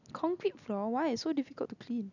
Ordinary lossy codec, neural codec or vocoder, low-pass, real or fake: none; none; 7.2 kHz; real